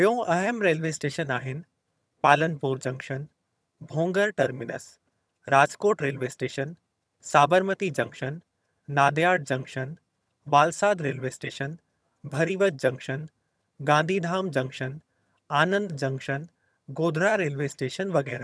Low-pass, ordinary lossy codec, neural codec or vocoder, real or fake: none; none; vocoder, 22.05 kHz, 80 mel bands, HiFi-GAN; fake